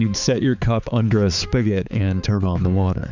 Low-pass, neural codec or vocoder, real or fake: 7.2 kHz; codec, 16 kHz, 4 kbps, X-Codec, HuBERT features, trained on balanced general audio; fake